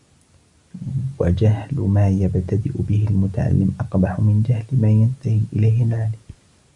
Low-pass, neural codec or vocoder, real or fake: 10.8 kHz; none; real